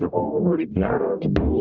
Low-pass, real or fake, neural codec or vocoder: 7.2 kHz; fake; codec, 44.1 kHz, 0.9 kbps, DAC